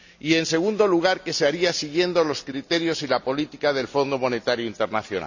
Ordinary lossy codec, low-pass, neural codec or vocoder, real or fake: none; 7.2 kHz; none; real